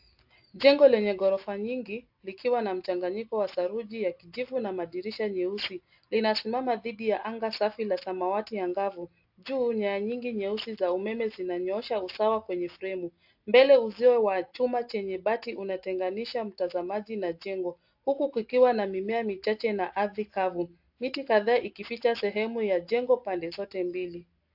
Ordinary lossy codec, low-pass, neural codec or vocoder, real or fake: AAC, 48 kbps; 5.4 kHz; none; real